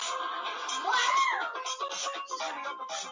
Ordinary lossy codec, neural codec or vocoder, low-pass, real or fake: MP3, 64 kbps; none; 7.2 kHz; real